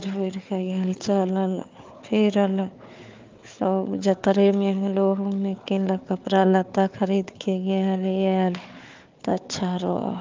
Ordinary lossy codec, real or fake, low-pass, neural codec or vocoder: Opus, 24 kbps; fake; 7.2 kHz; codec, 16 kHz, 4 kbps, FunCodec, trained on Chinese and English, 50 frames a second